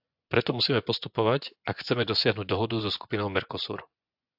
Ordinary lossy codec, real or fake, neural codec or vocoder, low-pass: AAC, 48 kbps; fake; vocoder, 24 kHz, 100 mel bands, Vocos; 5.4 kHz